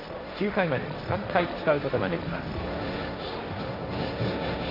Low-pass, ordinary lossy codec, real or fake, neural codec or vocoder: 5.4 kHz; AAC, 32 kbps; fake; codec, 16 kHz, 1.1 kbps, Voila-Tokenizer